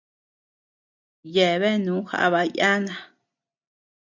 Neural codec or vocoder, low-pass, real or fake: none; 7.2 kHz; real